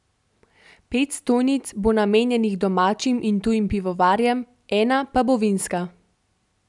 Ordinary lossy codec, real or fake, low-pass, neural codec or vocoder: none; real; 10.8 kHz; none